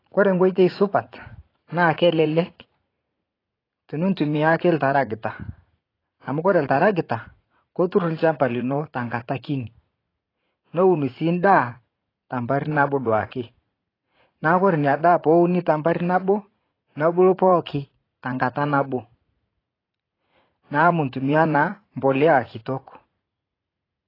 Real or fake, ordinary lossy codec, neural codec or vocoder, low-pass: fake; AAC, 24 kbps; vocoder, 44.1 kHz, 80 mel bands, Vocos; 5.4 kHz